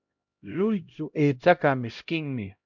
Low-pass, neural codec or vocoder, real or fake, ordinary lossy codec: 7.2 kHz; codec, 16 kHz, 0.5 kbps, X-Codec, HuBERT features, trained on LibriSpeech; fake; MP3, 64 kbps